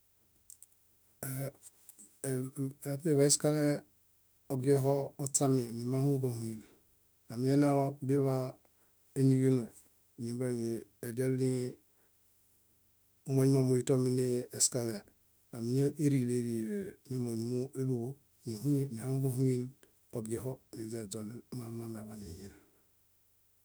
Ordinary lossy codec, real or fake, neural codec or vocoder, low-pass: none; fake; autoencoder, 48 kHz, 32 numbers a frame, DAC-VAE, trained on Japanese speech; none